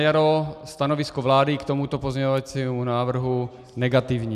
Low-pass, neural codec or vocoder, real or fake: 14.4 kHz; none; real